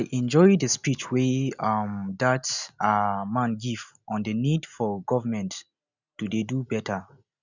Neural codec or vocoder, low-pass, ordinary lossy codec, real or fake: none; 7.2 kHz; none; real